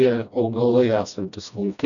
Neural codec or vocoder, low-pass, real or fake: codec, 16 kHz, 1 kbps, FreqCodec, smaller model; 7.2 kHz; fake